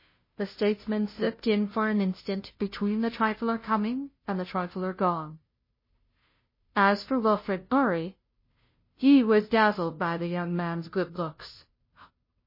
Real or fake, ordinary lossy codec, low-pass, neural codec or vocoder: fake; MP3, 24 kbps; 5.4 kHz; codec, 16 kHz, 0.5 kbps, FunCodec, trained on Chinese and English, 25 frames a second